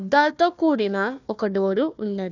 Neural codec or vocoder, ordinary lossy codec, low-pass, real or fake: codec, 16 kHz, 1 kbps, FunCodec, trained on Chinese and English, 50 frames a second; none; 7.2 kHz; fake